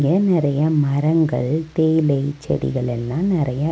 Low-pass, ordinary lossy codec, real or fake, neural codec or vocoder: none; none; real; none